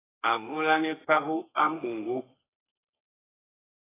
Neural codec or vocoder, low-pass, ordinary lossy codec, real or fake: codec, 32 kHz, 1.9 kbps, SNAC; 3.6 kHz; AAC, 16 kbps; fake